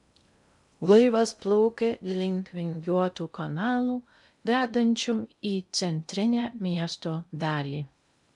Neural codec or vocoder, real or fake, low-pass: codec, 16 kHz in and 24 kHz out, 0.6 kbps, FocalCodec, streaming, 2048 codes; fake; 10.8 kHz